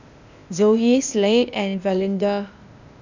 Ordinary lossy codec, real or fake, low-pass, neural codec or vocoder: none; fake; 7.2 kHz; codec, 16 kHz, 0.8 kbps, ZipCodec